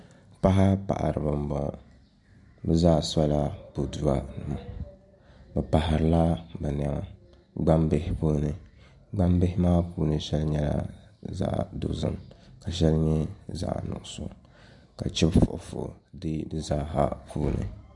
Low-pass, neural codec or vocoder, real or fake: 10.8 kHz; none; real